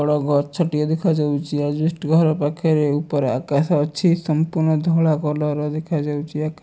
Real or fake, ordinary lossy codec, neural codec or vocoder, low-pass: real; none; none; none